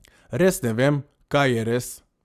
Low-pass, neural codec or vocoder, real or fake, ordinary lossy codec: 14.4 kHz; none; real; Opus, 64 kbps